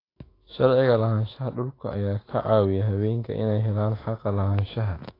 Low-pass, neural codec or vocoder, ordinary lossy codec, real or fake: 5.4 kHz; autoencoder, 48 kHz, 128 numbers a frame, DAC-VAE, trained on Japanese speech; AAC, 24 kbps; fake